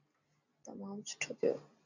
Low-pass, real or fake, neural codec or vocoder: 7.2 kHz; real; none